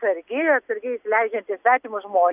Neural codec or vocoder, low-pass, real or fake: none; 3.6 kHz; real